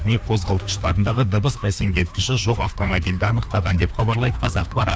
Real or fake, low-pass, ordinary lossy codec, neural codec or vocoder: fake; none; none; codec, 16 kHz, 2 kbps, FreqCodec, larger model